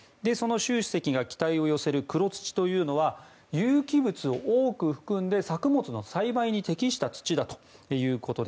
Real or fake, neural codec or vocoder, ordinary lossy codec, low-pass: real; none; none; none